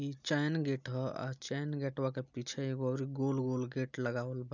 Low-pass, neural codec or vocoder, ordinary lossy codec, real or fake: 7.2 kHz; none; none; real